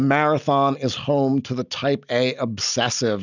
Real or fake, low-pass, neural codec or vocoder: real; 7.2 kHz; none